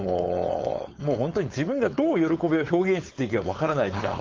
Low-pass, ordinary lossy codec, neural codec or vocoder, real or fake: 7.2 kHz; Opus, 32 kbps; codec, 16 kHz, 4.8 kbps, FACodec; fake